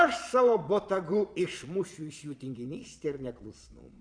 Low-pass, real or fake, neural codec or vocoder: 9.9 kHz; fake; vocoder, 22.05 kHz, 80 mel bands, Vocos